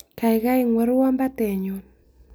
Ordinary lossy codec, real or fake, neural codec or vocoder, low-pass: none; real; none; none